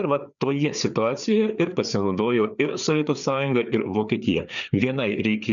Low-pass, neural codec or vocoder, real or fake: 7.2 kHz; codec, 16 kHz, 4 kbps, FreqCodec, larger model; fake